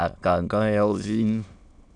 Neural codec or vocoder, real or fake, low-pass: autoencoder, 22.05 kHz, a latent of 192 numbers a frame, VITS, trained on many speakers; fake; 9.9 kHz